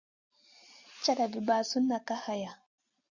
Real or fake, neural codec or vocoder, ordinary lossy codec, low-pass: real; none; Opus, 64 kbps; 7.2 kHz